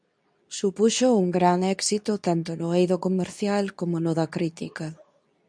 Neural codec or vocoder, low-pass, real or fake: codec, 24 kHz, 0.9 kbps, WavTokenizer, medium speech release version 2; 9.9 kHz; fake